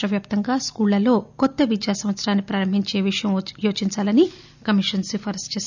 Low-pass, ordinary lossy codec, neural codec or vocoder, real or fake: 7.2 kHz; none; none; real